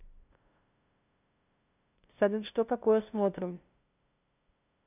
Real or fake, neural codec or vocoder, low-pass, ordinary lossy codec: fake; codec, 16 kHz, 0.5 kbps, FunCodec, trained on LibriTTS, 25 frames a second; 3.6 kHz; none